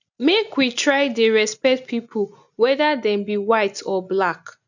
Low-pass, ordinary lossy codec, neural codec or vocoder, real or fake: 7.2 kHz; none; none; real